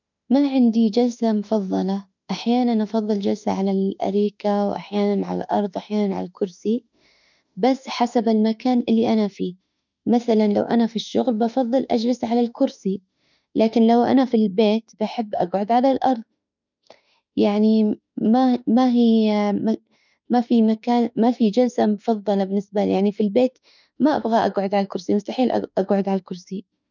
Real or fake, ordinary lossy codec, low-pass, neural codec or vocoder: fake; none; 7.2 kHz; autoencoder, 48 kHz, 32 numbers a frame, DAC-VAE, trained on Japanese speech